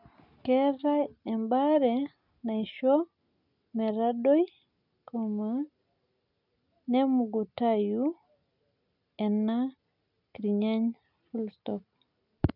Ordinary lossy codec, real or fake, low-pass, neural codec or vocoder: none; real; 5.4 kHz; none